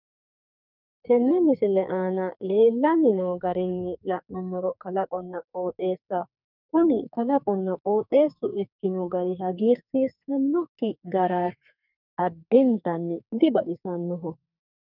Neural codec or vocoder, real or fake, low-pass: codec, 44.1 kHz, 2.6 kbps, SNAC; fake; 5.4 kHz